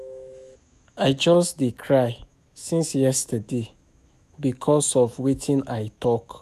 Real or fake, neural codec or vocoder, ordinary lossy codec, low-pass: fake; autoencoder, 48 kHz, 128 numbers a frame, DAC-VAE, trained on Japanese speech; none; 14.4 kHz